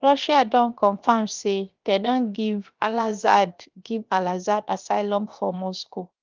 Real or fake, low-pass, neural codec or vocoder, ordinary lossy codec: fake; 7.2 kHz; codec, 16 kHz, 0.7 kbps, FocalCodec; Opus, 32 kbps